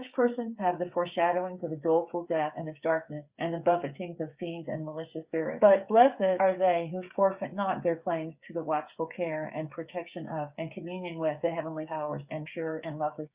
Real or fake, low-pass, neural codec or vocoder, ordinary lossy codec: fake; 3.6 kHz; codec, 16 kHz, 8 kbps, FreqCodec, smaller model; Opus, 64 kbps